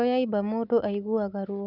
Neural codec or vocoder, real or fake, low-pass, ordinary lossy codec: none; real; 5.4 kHz; none